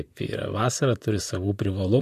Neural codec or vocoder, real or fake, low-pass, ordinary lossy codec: vocoder, 44.1 kHz, 128 mel bands, Pupu-Vocoder; fake; 14.4 kHz; MP3, 64 kbps